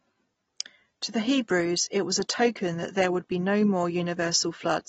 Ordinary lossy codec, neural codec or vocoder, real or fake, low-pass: AAC, 24 kbps; none; real; 10.8 kHz